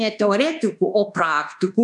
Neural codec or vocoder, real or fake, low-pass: codec, 24 kHz, 1.2 kbps, DualCodec; fake; 10.8 kHz